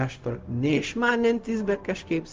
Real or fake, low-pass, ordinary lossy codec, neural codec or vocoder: fake; 7.2 kHz; Opus, 32 kbps; codec, 16 kHz, 0.4 kbps, LongCat-Audio-Codec